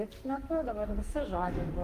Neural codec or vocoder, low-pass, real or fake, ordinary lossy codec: codec, 44.1 kHz, 2.6 kbps, SNAC; 14.4 kHz; fake; Opus, 32 kbps